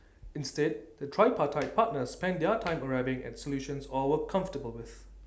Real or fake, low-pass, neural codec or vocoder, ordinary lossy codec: real; none; none; none